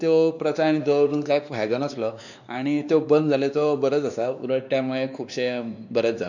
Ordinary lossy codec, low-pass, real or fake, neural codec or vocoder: none; 7.2 kHz; fake; codec, 16 kHz, 2 kbps, X-Codec, WavLM features, trained on Multilingual LibriSpeech